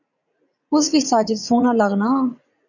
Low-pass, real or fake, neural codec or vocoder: 7.2 kHz; fake; vocoder, 44.1 kHz, 80 mel bands, Vocos